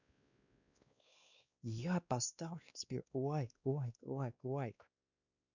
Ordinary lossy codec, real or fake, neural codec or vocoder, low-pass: none; fake; codec, 16 kHz, 2 kbps, X-Codec, WavLM features, trained on Multilingual LibriSpeech; 7.2 kHz